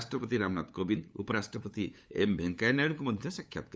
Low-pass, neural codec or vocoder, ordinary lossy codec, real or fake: none; codec, 16 kHz, 8 kbps, FunCodec, trained on LibriTTS, 25 frames a second; none; fake